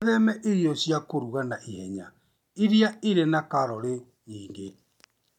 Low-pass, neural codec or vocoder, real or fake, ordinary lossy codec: 19.8 kHz; none; real; MP3, 96 kbps